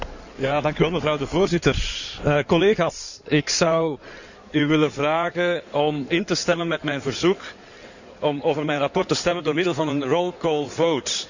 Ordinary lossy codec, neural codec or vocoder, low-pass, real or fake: none; codec, 16 kHz in and 24 kHz out, 2.2 kbps, FireRedTTS-2 codec; 7.2 kHz; fake